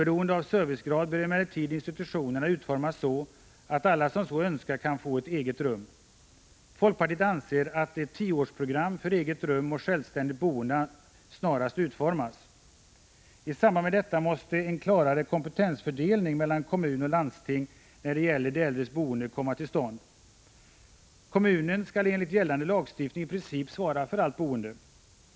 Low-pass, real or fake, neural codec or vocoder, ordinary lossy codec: none; real; none; none